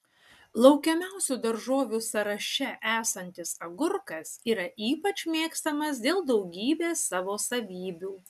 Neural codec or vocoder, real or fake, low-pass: none; real; 14.4 kHz